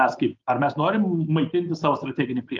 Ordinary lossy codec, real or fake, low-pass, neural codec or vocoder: Opus, 32 kbps; real; 7.2 kHz; none